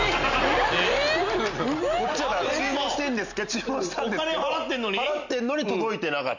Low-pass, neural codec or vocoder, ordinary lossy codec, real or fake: 7.2 kHz; none; none; real